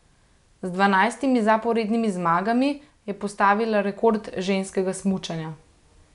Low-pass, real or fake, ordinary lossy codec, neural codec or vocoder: 10.8 kHz; real; none; none